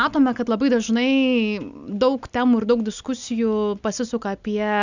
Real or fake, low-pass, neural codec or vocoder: real; 7.2 kHz; none